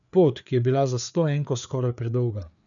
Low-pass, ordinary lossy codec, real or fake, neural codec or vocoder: 7.2 kHz; none; fake; codec, 16 kHz, 4 kbps, FreqCodec, larger model